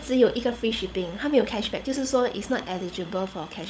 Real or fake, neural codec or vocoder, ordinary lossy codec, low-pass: fake; codec, 16 kHz, 16 kbps, FunCodec, trained on LibriTTS, 50 frames a second; none; none